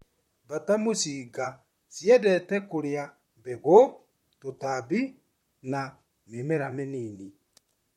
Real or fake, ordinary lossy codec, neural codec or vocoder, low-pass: fake; MP3, 64 kbps; vocoder, 44.1 kHz, 128 mel bands, Pupu-Vocoder; 19.8 kHz